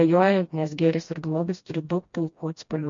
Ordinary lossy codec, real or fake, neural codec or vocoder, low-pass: MP3, 48 kbps; fake; codec, 16 kHz, 1 kbps, FreqCodec, smaller model; 7.2 kHz